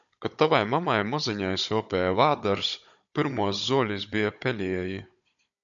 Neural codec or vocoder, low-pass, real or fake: codec, 16 kHz, 16 kbps, FunCodec, trained on Chinese and English, 50 frames a second; 7.2 kHz; fake